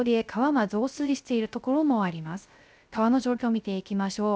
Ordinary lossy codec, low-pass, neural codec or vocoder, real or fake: none; none; codec, 16 kHz, 0.3 kbps, FocalCodec; fake